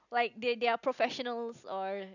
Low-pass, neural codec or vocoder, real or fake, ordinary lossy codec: 7.2 kHz; none; real; none